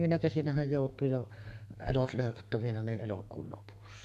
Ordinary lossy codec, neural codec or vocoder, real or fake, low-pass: none; codec, 32 kHz, 1.9 kbps, SNAC; fake; 14.4 kHz